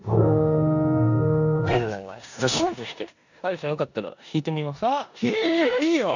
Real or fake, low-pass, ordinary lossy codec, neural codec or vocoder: fake; 7.2 kHz; none; codec, 16 kHz in and 24 kHz out, 0.9 kbps, LongCat-Audio-Codec, four codebook decoder